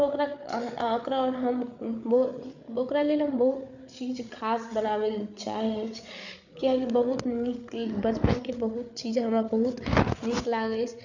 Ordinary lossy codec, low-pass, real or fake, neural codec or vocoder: none; 7.2 kHz; fake; codec, 16 kHz, 8 kbps, FreqCodec, larger model